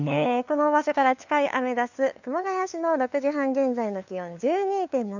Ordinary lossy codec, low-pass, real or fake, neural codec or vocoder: none; 7.2 kHz; fake; codec, 16 kHz, 2 kbps, FunCodec, trained on LibriTTS, 25 frames a second